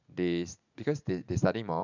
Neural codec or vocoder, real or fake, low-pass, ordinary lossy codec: vocoder, 44.1 kHz, 80 mel bands, Vocos; fake; 7.2 kHz; none